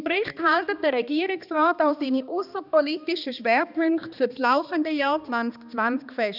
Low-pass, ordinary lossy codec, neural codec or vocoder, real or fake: 5.4 kHz; none; codec, 16 kHz, 2 kbps, X-Codec, HuBERT features, trained on balanced general audio; fake